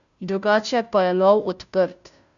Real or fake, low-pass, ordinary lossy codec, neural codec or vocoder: fake; 7.2 kHz; none; codec, 16 kHz, 0.5 kbps, FunCodec, trained on Chinese and English, 25 frames a second